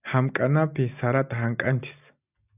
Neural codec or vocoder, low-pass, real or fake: none; 3.6 kHz; real